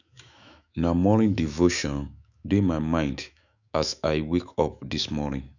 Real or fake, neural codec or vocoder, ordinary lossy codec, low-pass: fake; autoencoder, 48 kHz, 128 numbers a frame, DAC-VAE, trained on Japanese speech; none; 7.2 kHz